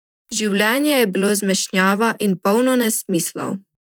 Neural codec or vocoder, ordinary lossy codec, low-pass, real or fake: vocoder, 44.1 kHz, 128 mel bands, Pupu-Vocoder; none; none; fake